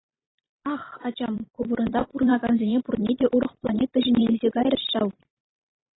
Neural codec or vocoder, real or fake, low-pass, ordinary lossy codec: vocoder, 44.1 kHz, 128 mel bands every 256 samples, BigVGAN v2; fake; 7.2 kHz; AAC, 16 kbps